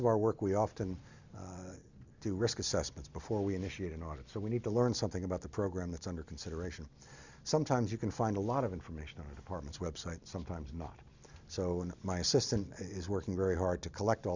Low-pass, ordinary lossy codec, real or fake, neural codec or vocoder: 7.2 kHz; Opus, 64 kbps; real; none